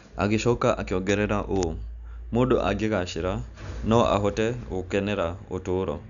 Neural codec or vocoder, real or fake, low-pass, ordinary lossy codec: none; real; 7.2 kHz; none